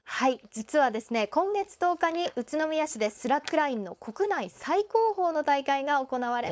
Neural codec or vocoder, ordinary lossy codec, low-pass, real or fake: codec, 16 kHz, 4.8 kbps, FACodec; none; none; fake